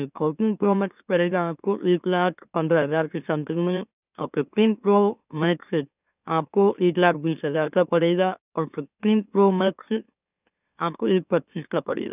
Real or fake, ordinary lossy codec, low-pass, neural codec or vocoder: fake; none; 3.6 kHz; autoencoder, 44.1 kHz, a latent of 192 numbers a frame, MeloTTS